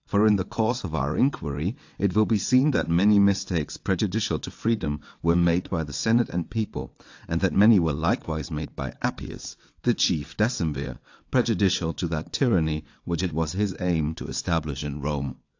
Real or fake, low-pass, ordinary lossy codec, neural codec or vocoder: fake; 7.2 kHz; AAC, 48 kbps; vocoder, 22.05 kHz, 80 mel bands, WaveNeXt